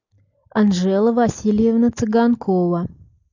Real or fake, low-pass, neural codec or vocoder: real; 7.2 kHz; none